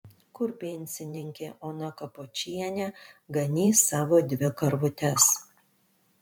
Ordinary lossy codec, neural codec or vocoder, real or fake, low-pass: MP3, 96 kbps; vocoder, 44.1 kHz, 128 mel bands every 512 samples, BigVGAN v2; fake; 19.8 kHz